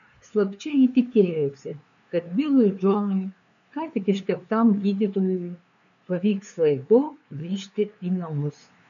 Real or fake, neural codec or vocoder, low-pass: fake; codec, 16 kHz, 2 kbps, FunCodec, trained on LibriTTS, 25 frames a second; 7.2 kHz